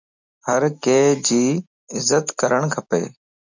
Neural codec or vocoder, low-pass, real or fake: none; 7.2 kHz; real